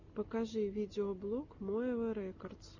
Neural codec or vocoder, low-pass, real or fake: none; 7.2 kHz; real